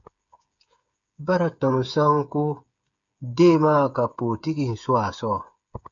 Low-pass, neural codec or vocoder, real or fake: 7.2 kHz; codec, 16 kHz, 8 kbps, FreqCodec, smaller model; fake